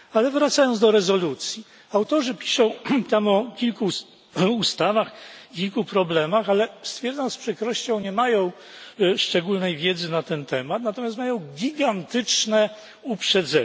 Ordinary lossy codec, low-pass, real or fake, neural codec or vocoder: none; none; real; none